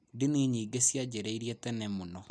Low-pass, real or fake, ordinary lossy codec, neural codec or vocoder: none; real; none; none